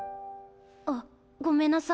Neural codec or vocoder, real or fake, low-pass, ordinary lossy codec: none; real; none; none